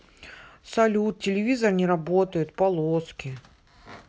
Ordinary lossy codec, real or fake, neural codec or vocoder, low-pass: none; real; none; none